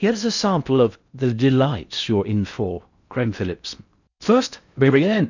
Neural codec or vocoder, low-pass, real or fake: codec, 16 kHz in and 24 kHz out, 0.6 kbps, FocalCodec, streaming, 4096 codes; 7.2 kHz; fake